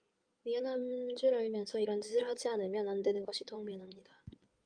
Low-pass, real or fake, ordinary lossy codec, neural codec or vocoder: 9.9 kHz; fake; Opus, 32 kbps; codec, 16 kHz in and 24 kHz out, 2.2 kbps, FireRedTTS-2 codec